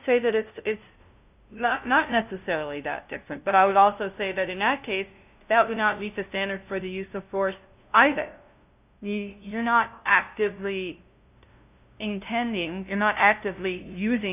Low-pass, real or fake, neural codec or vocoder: 3.6 kHz; fake; codec, 16 kHz, 0.5 kbps, FunCodec, trained on LibriTTS, 25 frames a second